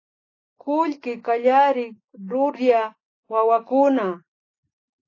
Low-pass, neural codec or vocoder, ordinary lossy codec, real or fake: 7.2 kHz; none; AAC, 32 kbps; real